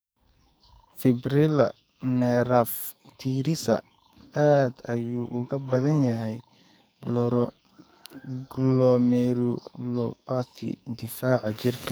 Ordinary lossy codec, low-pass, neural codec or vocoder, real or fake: none; none; codec, 44.1 kHz, 2.6 kbps, SNAC; fake